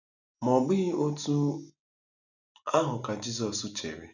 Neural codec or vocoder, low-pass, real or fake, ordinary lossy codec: none; 7.2 kHz; real; none